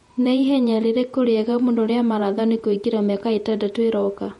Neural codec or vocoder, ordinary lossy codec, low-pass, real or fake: vocoder, 48 kHz, 128 mel bands, Vocos; MP3, 48 kbps; 19.8 kHz; fake